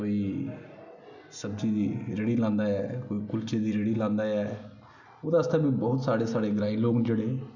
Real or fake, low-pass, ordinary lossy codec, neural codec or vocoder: real; 7.2 kHz; none; none